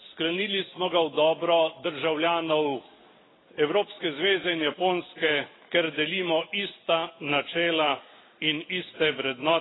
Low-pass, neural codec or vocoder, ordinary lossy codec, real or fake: 7.2 kHz; none; AAC, 16 kbps; real